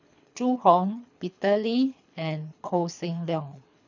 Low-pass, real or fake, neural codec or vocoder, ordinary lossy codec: 7.2 kHz; fake; codec, 24 kHz, 3 kbps, HILCodec; none